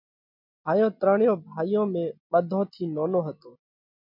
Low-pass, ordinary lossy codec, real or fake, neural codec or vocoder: 5.4 kHz; MP3, 48 kbps; real; none